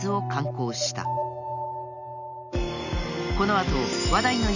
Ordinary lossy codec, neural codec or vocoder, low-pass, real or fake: none; none; 7.2 kHz; real